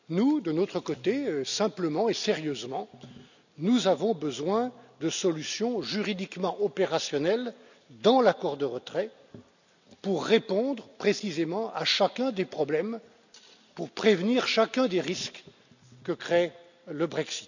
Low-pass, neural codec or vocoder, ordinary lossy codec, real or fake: 7.2 kHz; none; none; real